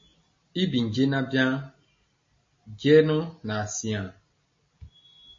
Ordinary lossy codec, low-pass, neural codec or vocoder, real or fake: MP3, 32 kbps; 7.2 kHz; none; real